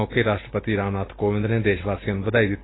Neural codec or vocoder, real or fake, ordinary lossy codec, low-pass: none; real; AAC, 16 kbps; 7.2 kHz